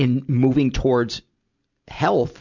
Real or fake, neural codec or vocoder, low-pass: real; none; 7.2 kHz